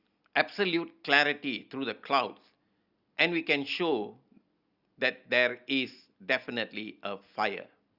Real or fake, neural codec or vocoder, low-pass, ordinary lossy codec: real; none; 5.4 kHz; Opus, 64 kbps